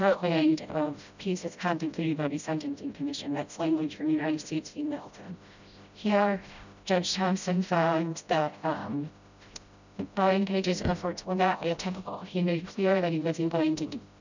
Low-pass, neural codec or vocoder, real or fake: 7.2 kHz; codec, 16 kHz, 0.5 kbps, FreqCodec, smaller model; fake